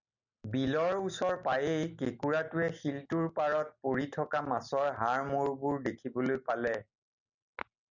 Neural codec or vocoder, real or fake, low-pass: none; real; 7.2 kHz